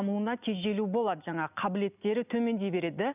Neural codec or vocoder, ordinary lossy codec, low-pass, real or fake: none; none; 3.6 kHz; real